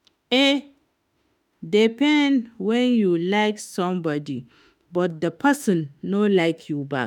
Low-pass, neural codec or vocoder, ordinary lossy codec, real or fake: 19.8 kHz; autoencoder, 48 kHz, 32 numbers a frame, DAC-VAE, trained on Japanese speech; none; fake